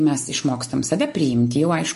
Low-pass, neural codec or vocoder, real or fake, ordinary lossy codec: 14.4 kHz; none; real; MP3, 48 kbps